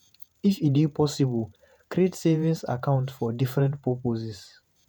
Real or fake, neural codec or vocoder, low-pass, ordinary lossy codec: fake; vocoder, 48 kHz, 128 mel bands, Vocos; none; none